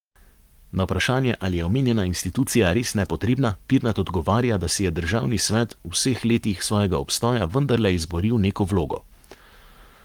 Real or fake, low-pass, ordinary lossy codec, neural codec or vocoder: fake; 19.8 kHz; Opus, 32 kbps; codec, 44.1 kHz, 7.8 kbps, Pupu-Codec